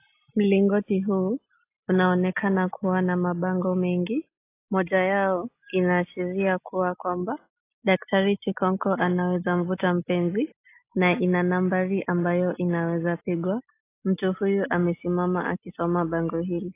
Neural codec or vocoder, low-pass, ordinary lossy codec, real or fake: none; 3.6 kHz; AAC, 24 kbps; real